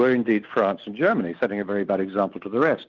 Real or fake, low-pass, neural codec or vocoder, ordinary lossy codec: real; 7.2 kHz; none; Opus, 24 kbps